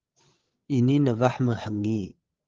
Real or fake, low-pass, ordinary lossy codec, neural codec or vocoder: fake; 7.2 kHz; Opus, 16 kbps; codec, 16 kHz, 8 kbps, FreqCodec, larger model